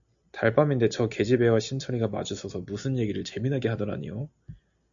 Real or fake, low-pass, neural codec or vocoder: real; 7.2 kHz; none